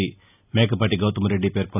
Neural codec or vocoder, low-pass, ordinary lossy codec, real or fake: none; 3.6 kHz; none; real